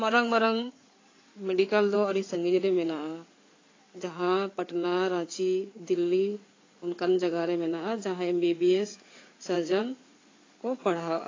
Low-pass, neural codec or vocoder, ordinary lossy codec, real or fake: 7.2 kHz; codec, 16 kHz in and 24 kHz out, 2.2 kbps, FireRedTTS-2 codec; AAC, 32 kbps; fake